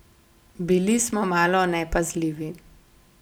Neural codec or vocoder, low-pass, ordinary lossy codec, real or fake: none; none; none; real